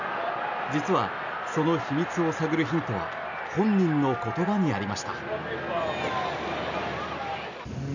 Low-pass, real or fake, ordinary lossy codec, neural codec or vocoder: 7.2 kHz; real; none; none